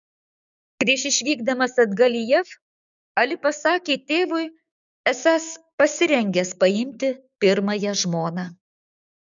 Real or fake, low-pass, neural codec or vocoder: fake; 7.2 kHz; codec, 16 kHz, 6 kbps, DAC